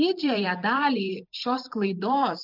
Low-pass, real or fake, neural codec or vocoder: 5.4 kHz; fake; vocoder, 44.1 kHz, 128 mel bands every 512 samples, BigVGAN v2